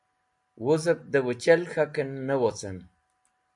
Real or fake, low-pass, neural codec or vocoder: real; 10.8 kHz; none